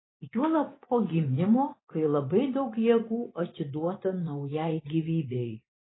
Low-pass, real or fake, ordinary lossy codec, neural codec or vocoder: 7.2 kHz; fake; AAC, 16 kbps; vocoder, 24 kHz, 100 mel bands, Vocos